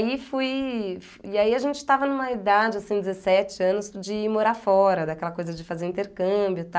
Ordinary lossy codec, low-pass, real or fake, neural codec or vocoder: none; none; real; none